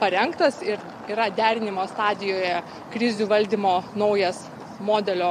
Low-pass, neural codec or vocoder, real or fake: 14.4 kHz; none; real